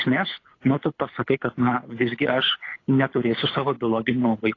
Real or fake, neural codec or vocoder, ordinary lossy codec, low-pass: fake; codec, 24 kHz, 6 kbps, HILCodec; AAC, 32 kbps; 7.2 kHz